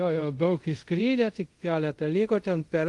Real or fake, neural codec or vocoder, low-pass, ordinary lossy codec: fake; codec, 24 kHz, 0.5 kbps, DualCodec; 10.8 kHz; Opus, 24 kbps